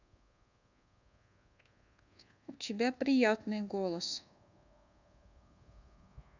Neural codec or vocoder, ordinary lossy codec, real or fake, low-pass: codec, 24 kHz, 1.2 kbps, DualCodec; none; fake; 7.2 kHz